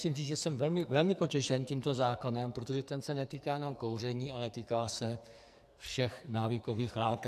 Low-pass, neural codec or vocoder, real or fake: 14.4 kHz; codec, 44.1 kHz, 2.6 kbps, SNAC; fake